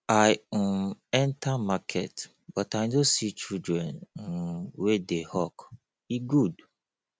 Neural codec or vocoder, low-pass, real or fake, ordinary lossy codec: none; none; real; none